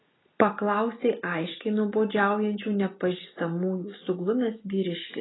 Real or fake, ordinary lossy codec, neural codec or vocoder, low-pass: real; AAC, 16 kbps; none; 7.2 kHz